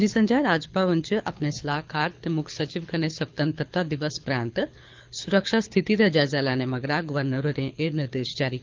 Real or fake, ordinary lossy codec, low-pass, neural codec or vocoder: fake; Opus, 24 kbps; 7.2 kHz; codec, 24 kHz, 6 kbps, HILCodec